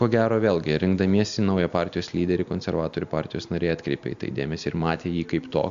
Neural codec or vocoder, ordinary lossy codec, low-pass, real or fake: none; AAC, 96 kbps; 7.2 kHz; real